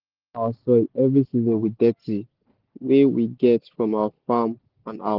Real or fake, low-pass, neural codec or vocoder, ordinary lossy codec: real; 5.4 kHz; none; Opus, 16 kbps